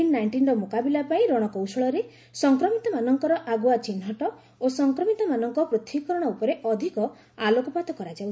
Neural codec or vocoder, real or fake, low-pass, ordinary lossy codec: none; real; none; none